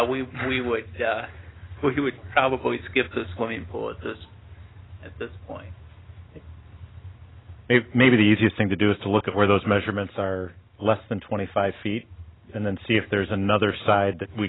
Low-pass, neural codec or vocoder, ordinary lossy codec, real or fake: 7.2 kHz; none; AAC, 16 kbps; real